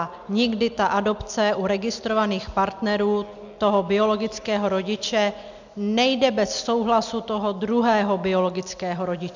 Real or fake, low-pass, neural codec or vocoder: real; 7.2 kHz; none